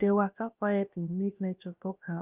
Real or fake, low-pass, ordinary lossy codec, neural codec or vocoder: fake; 3.6 kHz; Opus, 32 kbps; codec, 16 kHz, about 1 kbps, DyCAST, with the encoder's durations